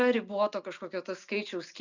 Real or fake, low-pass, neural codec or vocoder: real; 7.2 kHz; none